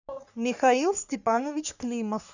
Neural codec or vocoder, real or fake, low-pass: codec, 44.1 kHz, 3.4 kbps, Pupu-Codec; fake; 7.2 kHz